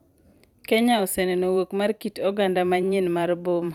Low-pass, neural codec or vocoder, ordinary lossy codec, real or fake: 19.8 kHz; vocoder, 44.1 kHz, 128 mel bands every 512 samples, BigVGAN v2; none; fake